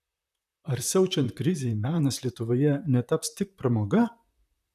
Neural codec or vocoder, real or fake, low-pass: vocoder, 44.1 kHz, 128 mel bands, Pupu-Vocoder; fake; 14.4 kHz